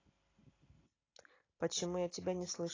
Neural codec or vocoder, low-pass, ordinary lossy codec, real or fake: none; 7.2 kHz; AAC, 32 kbps; real